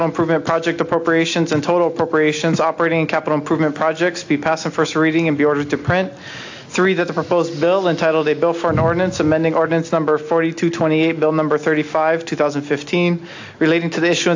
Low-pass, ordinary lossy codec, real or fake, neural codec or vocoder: 7.2 kHz; AAC, 48 kbps; real; none